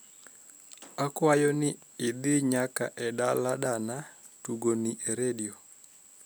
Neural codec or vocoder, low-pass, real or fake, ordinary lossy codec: none; none; real; none